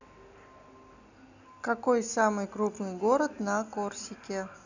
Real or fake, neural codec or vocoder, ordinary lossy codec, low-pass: real; none; none; 7.2 kHz